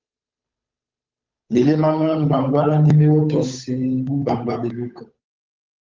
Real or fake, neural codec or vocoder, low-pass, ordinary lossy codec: fake; codec, 16 kHz, 8 kbps, FunCodec, trained on Chinese and English, 25 frames a second; 7.2 kHz; Opus, 24 kbps